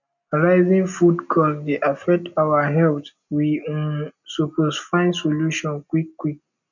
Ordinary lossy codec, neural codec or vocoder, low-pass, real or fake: none; none; 7.2 kHz; real